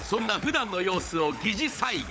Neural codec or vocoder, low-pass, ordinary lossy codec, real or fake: codec, 16 kHz, 16 kbps, FunCodec, trained on LibriTTS, 50 frames a second; none; none; fake